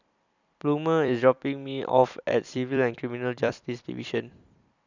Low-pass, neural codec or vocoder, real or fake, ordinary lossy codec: 7.2 kHz; vocoder, 44.1 kHz, 128 mel bands every 256 samples, BigVGAN v2; fake; none